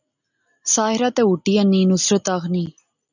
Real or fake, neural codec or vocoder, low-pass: real; none; 7.2 kHz